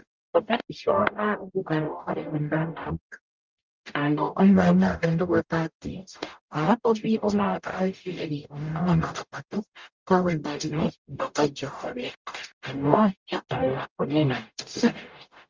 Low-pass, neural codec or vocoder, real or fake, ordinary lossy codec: 7.2 kHz; codec, 44.1 kHz, 0.9 kbps, DAC; fake; Opus, 32 kbps